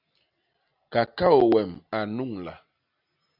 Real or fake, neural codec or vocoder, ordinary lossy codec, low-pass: real; none; AAC, 48 kbps; 5.4 kHz